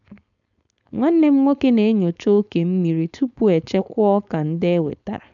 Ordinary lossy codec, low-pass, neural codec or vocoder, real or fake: none; 7.2 kHz; codec, 16 kHz, 4.8 kbps, FACodec; fake